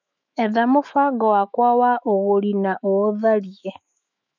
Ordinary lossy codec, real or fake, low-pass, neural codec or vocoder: none; fake; 7.2 kHz; autoencoder, 48 kHz, 128 numbers a frame, DAC-VAE, trained on Japanese speech